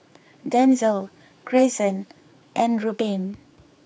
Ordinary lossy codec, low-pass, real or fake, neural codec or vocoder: none; none; fake; codec, 16 kHz, 4 kbps, X-Codec, HuBERT features, trained on general audio